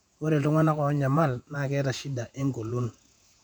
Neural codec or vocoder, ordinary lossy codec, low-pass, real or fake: vocoder, 48 kHz, 128 mel bands, Vocos; none; 19.8 kHz; fake